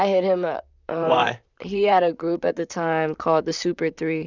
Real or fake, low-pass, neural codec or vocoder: fake; 7.2 kHz; vocoder, 44.1 kHz, 128 mel bands, Pupu-Vocoder